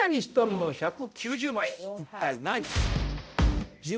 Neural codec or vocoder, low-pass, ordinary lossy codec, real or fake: codec, 16 kHz, 0.5 kbps, X-Codec, HuBERT features, trained on balanced general audio; none; none; fake